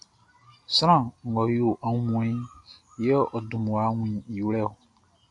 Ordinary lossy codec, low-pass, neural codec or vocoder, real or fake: AAC, 48 kbps; 10.8 kHz; none; real